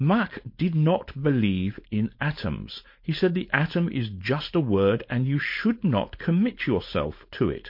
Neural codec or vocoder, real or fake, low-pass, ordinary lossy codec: codec, 16 kHz, 4.8 kbps, FACodec; fake; 5.4 kHz; MP3, 32 kbps